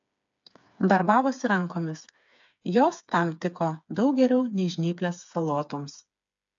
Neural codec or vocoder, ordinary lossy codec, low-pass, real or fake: codec, 16 kHz, 4 kbps, FreqCodec, smaller model; AAC, 64 kbps; 7.2 kHz; fake